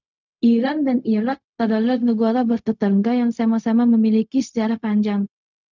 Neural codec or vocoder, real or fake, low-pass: codec, 16 kHz, 0.4 kbps, LongCat-Audio-Codec; fake; 7.2 kHz